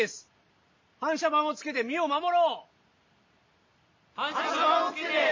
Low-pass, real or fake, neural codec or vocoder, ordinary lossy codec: 7.2 kHz; real; none; MP3, 32 kbps